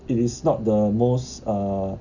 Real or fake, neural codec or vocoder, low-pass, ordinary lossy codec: real; none; 7.2 kHz; none